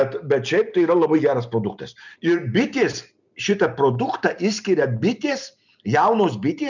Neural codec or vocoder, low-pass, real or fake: none; 7.2 kHz; real